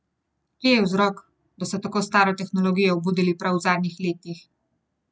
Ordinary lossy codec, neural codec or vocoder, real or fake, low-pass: none; none; real; none